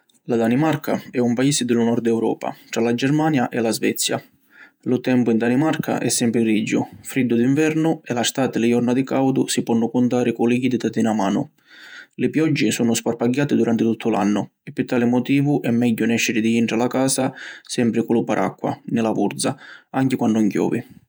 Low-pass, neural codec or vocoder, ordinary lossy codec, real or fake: none; vocoder, 48 kHz, 128 mel bands, Vocos; none; fake